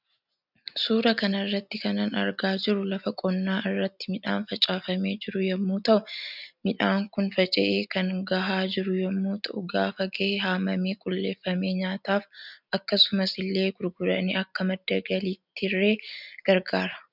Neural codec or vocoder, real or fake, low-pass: none; real; 5.4 kHz